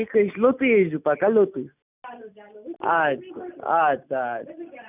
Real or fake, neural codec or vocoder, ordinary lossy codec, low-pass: real; none; none; 3.6 kHz